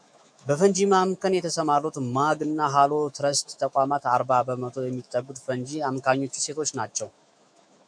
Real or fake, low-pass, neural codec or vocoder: fake; 9.9 kHz; autoencoder, 48 kHz, 128 numbers a frame, DAC-VAE, trained on Japanese speech